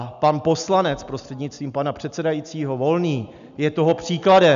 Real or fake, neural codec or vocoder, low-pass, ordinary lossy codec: real; none; 7.2 kHz; MP3, 96 kbps